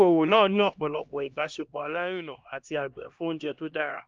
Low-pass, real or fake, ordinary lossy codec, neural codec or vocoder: 7.2 kHz; fake; Opus, 24 kbps; codec, 16 kHz, 1 kbps, X-Codec, HuBERT features, trained on LibriSpeech